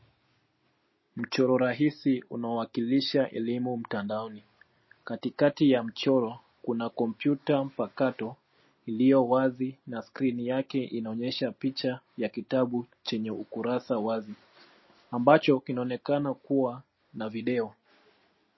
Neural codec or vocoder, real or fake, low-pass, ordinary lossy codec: none; real; 7.2 kHz; MP3, 24 kbps